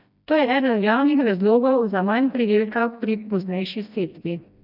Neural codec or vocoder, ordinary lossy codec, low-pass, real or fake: codec, 16 kHz, 1 kbps, FreqCodec, smaller model; none; 5.4 kHz; fake